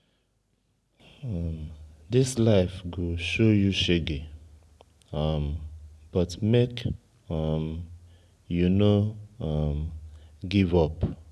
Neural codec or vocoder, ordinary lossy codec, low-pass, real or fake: none; none; none; real